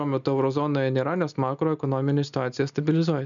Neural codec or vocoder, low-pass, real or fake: none; 7.2 kHz; real